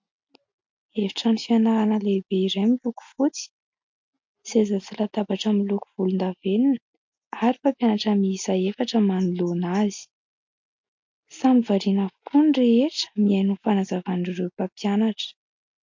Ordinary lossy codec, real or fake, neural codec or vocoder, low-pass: MP3, 48 kbps; real; none; 7.2 kHz